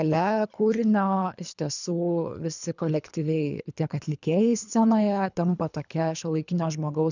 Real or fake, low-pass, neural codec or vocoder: fake; 7.2 kHz; codec, 24 kHz, 3 kbps, HILCodec